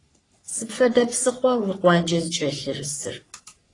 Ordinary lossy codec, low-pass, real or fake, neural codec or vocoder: AAC, 32 kbps; 10.8 kHz; fake; codec, 44.1 kHz, 3.4 kbps, Pupu-Codec